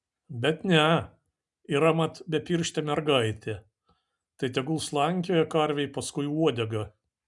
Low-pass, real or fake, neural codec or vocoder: 10.8 kHz; real; none